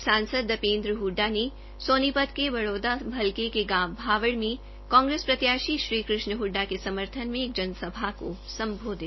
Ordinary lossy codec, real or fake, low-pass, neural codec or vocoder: MP3, 24 kbps; real; 7.2 kHz; none